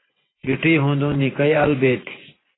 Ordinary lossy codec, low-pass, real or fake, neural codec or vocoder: AAC, 16 kbps; 7.2 kHz; fake; vocoder, 24 kHz, 100 mel bands, Vocos